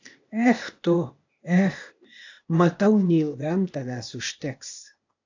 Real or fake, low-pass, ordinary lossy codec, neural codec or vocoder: fake; 7.2 kHz; AAC, 48 kbps; codec, 16 kHz, 0.8 kbps, ZipCodec